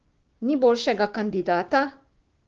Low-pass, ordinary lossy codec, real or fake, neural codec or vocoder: 7.2 kHz; Opus, 16 kbps; fake; codec, 16 kHz, 6 kbps, DAC